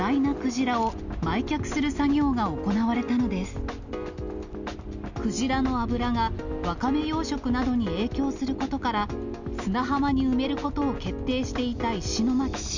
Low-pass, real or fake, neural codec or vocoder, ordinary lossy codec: 7.2 kHz; real; none; none